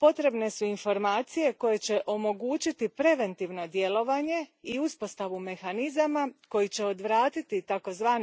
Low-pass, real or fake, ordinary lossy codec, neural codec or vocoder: none; real; none; none